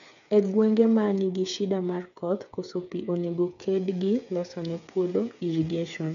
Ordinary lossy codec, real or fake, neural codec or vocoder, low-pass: none; fake; codec, 16 kHz, 8 kbps, FreqCodec, smaller model; 7.2 kHz